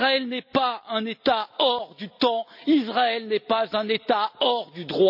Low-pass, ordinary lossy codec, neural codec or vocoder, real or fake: 5.4 kHz; none; none; real